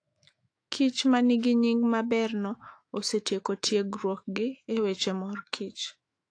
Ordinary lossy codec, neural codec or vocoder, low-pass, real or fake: AAC, 48 kbps; autoencoder, 48 kHz, 128 numbers a frame, DAC-VAE, trained on Japanese speech; 9.9 kHz; fake